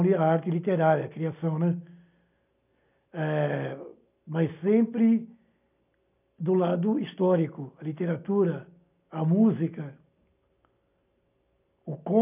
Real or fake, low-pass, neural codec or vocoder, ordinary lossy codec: real; 3.6 kHz; none; none